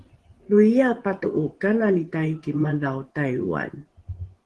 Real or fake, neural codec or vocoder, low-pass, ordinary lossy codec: fake; vocoder, 24 kHz, 100 mel bands, Vocos; 10.8 kHz; Opus, 16 kbps